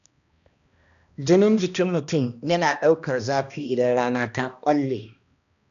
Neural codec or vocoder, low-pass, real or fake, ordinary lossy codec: codec, 16 kHz, 1 kbps, X-Codec, HuBERT features, trained on balanced general audio; 7.2 kHz; fake; none